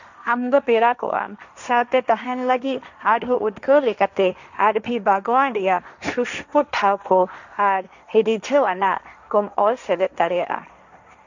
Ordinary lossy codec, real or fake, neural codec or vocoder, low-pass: none; fake; codec, 16 kHz, 1.1 kbps, Voila-Tokenizer; 7.2 kHz